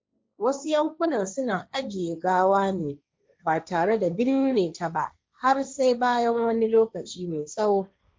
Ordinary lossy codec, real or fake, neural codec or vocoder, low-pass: none; fake; codec, 16 kHz, 1.1 kbps, Voila-Tokenizer; none